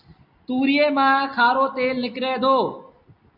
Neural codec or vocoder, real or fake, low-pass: none; real; 5.4 kHz